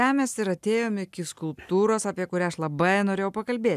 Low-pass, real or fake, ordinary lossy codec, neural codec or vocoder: 14.4 kHz; real; MP3, 96 kbps; none